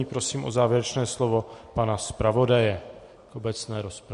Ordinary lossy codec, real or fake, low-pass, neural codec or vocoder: MP3, 48 kbps; real; 14.4 kHz; none